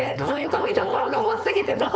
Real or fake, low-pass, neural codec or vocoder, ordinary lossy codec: fake; none; codec, 16 kHz, 4.8 kbps, FACodec; none